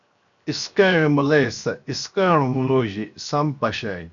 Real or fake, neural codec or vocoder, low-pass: fake; codec, 16 kHz, 0.7 kbps, FocalCodec; 7.2 kHz